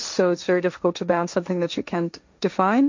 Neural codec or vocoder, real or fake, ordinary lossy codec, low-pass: codec, 16 kHz, 1.1 kbps, Voila-Tokenizer; fake; MP3, 48 kbps; 7.2 kHz